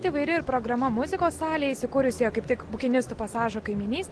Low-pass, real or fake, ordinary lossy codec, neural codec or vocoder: 10.8 kHz; real; Opus, 16 kbps; none